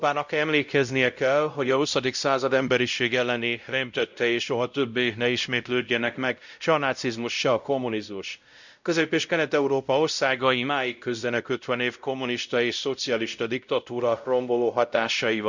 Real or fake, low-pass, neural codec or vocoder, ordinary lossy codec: fake; 7.2 kHz; codec, 16 kHz, 0.5 kbps, X-Codec, WavLM features, trained on Multilingual LibriSpeech; none